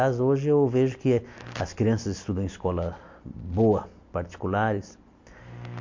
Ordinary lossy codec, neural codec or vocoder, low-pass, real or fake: MP3, 48 kbps; none; 7.2 kHz; real